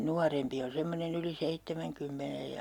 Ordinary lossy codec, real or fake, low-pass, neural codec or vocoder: none; real; 19.8 kHz; none